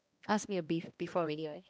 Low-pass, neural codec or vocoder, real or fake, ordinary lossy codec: none; codec, 16 kHz, 1 kbps, X-Codec, HuBERT features, trained on balanced general audio; fake; none